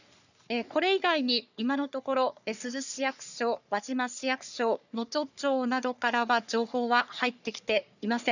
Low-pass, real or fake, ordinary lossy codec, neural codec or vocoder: 7.2 kHz; fake; none; codec, 44.1 kHz, 3.4 kbps, Pupu-Codec